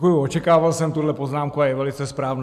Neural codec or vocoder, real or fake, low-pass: none; real; 14.4 kHz